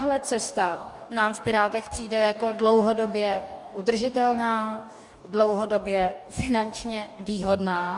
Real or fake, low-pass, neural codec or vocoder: fake; 10.8 kHz; codec, 44.1 kHz, 2.6 kbps, DAC